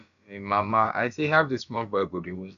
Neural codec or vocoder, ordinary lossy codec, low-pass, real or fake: codec, 16 kHz, about 1 kbps, DyCAST, with the encoder's durations; none; 7.2 kHz; fake